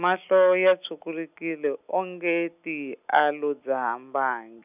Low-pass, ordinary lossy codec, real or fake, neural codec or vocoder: 3.6 kHz; none; real; none